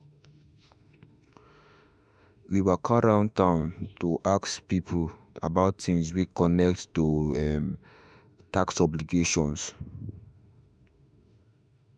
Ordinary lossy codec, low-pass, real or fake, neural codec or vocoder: none; 9.9 kHz; fake; autoencoder, 48 kHz, 32 numbers a frame, DAC-VAE, trained on Japanese speech